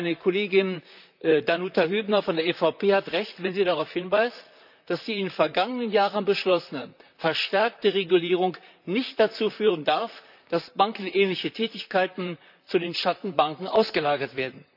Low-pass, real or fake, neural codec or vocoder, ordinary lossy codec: 5.4 kHz; fake; vocoder, 44.1 kHz, 128 mel bands, Pupu-Vocoder; none